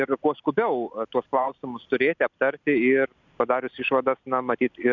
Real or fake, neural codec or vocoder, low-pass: real; none; 7.2 kHz